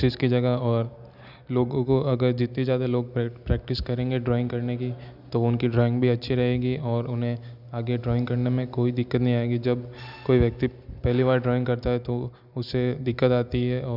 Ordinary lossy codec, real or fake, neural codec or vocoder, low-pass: none; real; none; 5.4 kHz